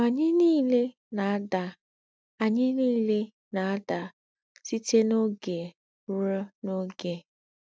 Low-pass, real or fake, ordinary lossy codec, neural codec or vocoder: none; real; none; none